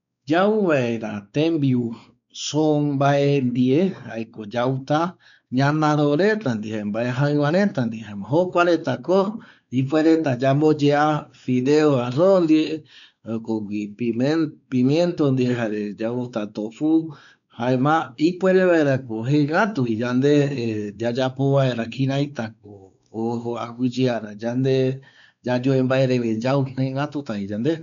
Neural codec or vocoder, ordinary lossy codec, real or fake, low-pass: codec, 16 kHz, 4 kbps, X-Codec, WavLM features, trained on Multilingual LibriSpeech; none; fake; 7.2 kHz